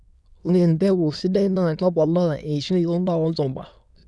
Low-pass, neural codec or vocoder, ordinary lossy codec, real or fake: none; autoencoder, 22.05 kHz, a latent of 192 numbers a frame, VITS, trained on many speakers; none; fake